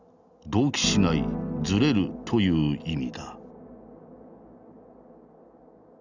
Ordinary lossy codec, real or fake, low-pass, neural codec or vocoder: none; real; 7.2 kHz; none